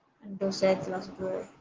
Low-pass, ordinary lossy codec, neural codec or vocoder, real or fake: 7.2 kHz; Opus, 16 kbps; none; real